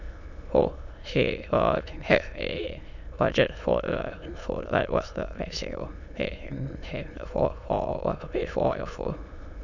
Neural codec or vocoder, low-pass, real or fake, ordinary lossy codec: autoencoder, 22.05 kHz, a latent of 192 numbers a frame, VITS, trained on many speakers; 7.2 kHz; fake; none